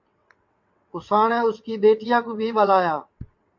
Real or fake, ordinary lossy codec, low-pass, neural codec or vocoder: fake; MP3, 48 kbps; 7.2 kHz; vocoder, 22.05 kHz, 80 mel bands, WaveNeXt